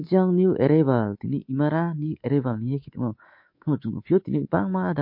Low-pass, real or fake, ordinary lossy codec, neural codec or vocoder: 5.4 kHz; fake; MP3, 32 kbps; codec, 24 kHz, 3.1 kbps, DualCodec